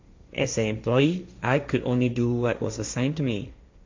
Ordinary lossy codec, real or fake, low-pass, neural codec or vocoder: MP3, 64 kbps; fake; 7.2 kHz; codec, 16 kHz, 1.1 kbps, Voila-Tokenizer